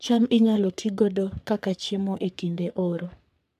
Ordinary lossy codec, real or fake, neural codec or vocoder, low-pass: none; fake; codec, 44.1 kHz, 3.4 kbps, Pupu-Codec; 14.4 kHz